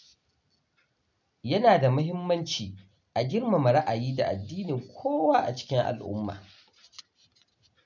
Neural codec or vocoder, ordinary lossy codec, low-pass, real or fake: none; none; 7.2 kHz; real